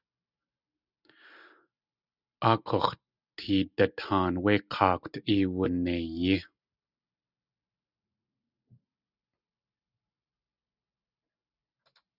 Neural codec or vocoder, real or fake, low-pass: none; real; 5.4 kHz